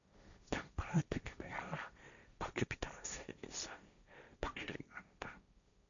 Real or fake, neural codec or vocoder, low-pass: fake; codec, 16 kHz, 1.1 kbps, Voila-Tokenizer; 7.2 kHz